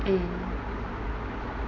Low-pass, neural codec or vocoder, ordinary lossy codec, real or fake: 7.2 kHz; none; none; real